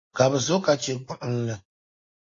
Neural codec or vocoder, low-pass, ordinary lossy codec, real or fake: none; 7.2 kHz; AAC, 32 kbps; real